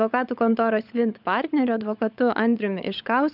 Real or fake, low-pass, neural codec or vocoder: fake; 5.4 kHz; codec, 16 kHz, 16 kbps, FunCodec, trained on LibriTTS, 50 frames a second